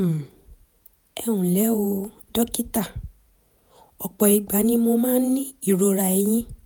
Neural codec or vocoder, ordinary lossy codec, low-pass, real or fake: vocoder, 48 kHz, 128 mel bands, Vocos; none; none; fake